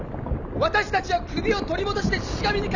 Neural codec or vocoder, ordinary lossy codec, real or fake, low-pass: none; none; real; 7.2 kHz